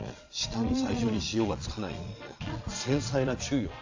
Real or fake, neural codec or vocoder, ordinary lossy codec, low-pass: fake; vocoder, 22.05 kHz, 80 mel bands, Vocos; AAC, 48 kbps; 7.2 kHz